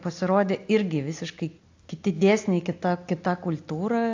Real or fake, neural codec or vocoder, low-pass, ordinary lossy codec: real; none; 7.2 kHz; AAC, 48 kbps